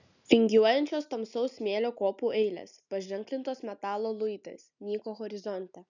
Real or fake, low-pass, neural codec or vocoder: real; 7.2 kHz; none